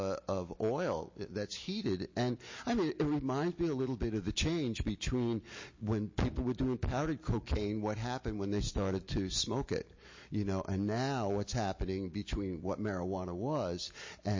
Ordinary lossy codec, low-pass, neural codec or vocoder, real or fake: MP3, 32 kbps; 7.2 kHz; none; real